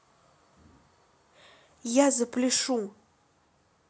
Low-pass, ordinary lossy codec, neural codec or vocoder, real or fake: none; none; none; real